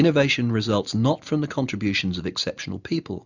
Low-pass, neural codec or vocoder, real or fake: 7.2 kHz; none; real